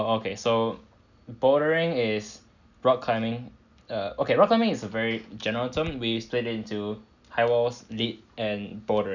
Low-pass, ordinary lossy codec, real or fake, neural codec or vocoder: 7.2 kHz; none; real; none